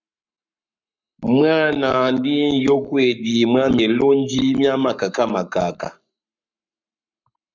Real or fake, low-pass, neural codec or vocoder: fake; 7.2 kHz; codec, 44.1 kHz, 7.8 kbps, Pupu-Codec